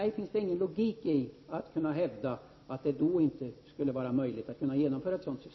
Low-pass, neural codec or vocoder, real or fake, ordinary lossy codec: 7.2 kHz; none; real; MP3, 24 kbps